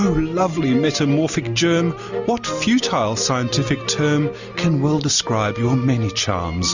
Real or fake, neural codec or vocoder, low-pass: real; none; 7.2 kHz